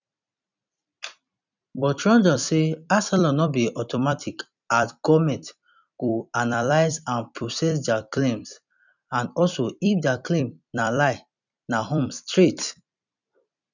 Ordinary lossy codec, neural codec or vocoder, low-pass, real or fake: none; vocoder, 44.1 kHz, 128 mel bands every 256 samples, BigVGAN v2; 7.2 kHz; fake